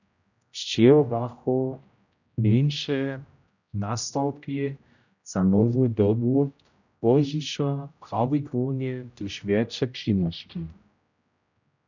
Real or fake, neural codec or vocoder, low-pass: fake; codec, 16 kHz, 0.5 kbps, X-Codec, HuBERT features, trained on general audio; 7.2 kHz